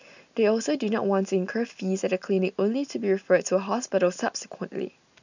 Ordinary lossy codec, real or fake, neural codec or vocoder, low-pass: none; real; none; 7.2 kHz